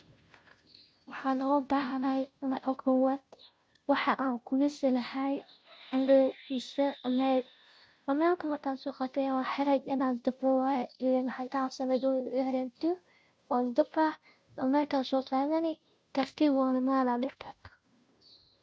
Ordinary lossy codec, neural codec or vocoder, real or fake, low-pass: none; codec, 16 kHz, 0.5 kbps, FunCodec, trained on Chinese and English, 25 frames a second; fake; none